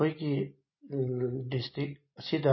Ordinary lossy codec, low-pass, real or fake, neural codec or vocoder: MP3, 24 kbps; 7.2 kHz; real; none